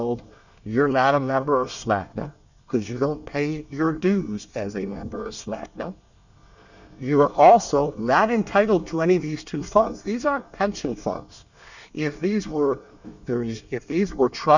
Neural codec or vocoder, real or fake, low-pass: codec, 24 kHz, 1 kbps, SNAC; fake; 7.2 kHz